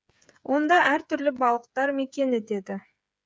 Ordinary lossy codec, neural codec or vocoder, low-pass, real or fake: none; codec, 16 kHz, 8 kbps, FreqCodec, smaller model; none; fake